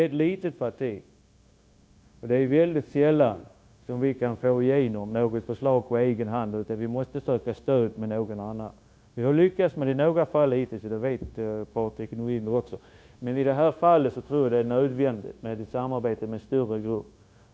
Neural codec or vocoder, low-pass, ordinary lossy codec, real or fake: codec, 16 kHz, 0.9 kbps, LongCat-Audio-Codec; none; none; fake